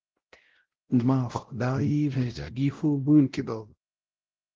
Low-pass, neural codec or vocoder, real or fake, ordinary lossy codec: 7.2 kHz; codec, 16 kHz, 0.5 kbps, X-Codec, WavLM features, trained on Multilingual LibriSpeech; fake; Opus, 16 kbps